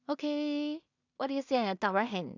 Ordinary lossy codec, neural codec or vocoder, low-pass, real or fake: none; codec, 16 kHz in and 24 kHz out, 0.4 kbps, LongCat-Audio-Codec, two codebook decoder; 7.2 kHz; fake